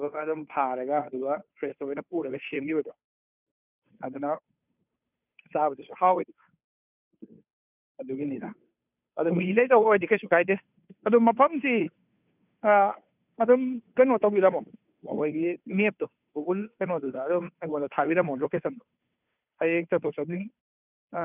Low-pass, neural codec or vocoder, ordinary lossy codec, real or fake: 3.6 kHz; codec, 16 kHz, 2 kbps, FunCodec, trained on Chinese and English, 25 frames a second; none; fake